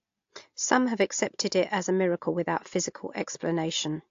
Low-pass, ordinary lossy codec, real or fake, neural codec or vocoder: 7.2 kHz; AAC, 48 kbps; real; none